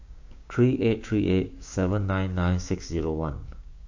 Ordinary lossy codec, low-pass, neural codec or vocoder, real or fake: AAC, 48 kbps; 7.2 kHz; codec, 16 kHz, 6 kbps, DAC; fake